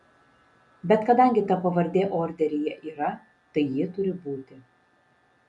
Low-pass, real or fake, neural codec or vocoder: 10.8 kHz; real; none